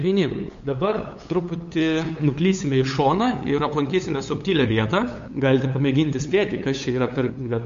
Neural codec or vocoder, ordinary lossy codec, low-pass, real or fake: codec, 16 kHz, 8 kbps, FunCodec, trained on LibriTTS, 25 frames a second; MP3, 48 kbps; 7.2 kHz; fake